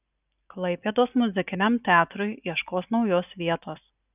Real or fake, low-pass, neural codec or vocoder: real; 3.6 kHz; none